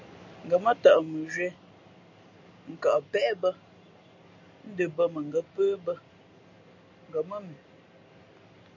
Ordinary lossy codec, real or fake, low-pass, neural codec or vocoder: AAC, 48 kbps; real; 7.2 kHz; none